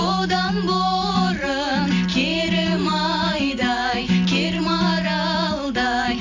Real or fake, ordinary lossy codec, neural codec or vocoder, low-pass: real; AAC, 48 kbps; none; 7.2 kHz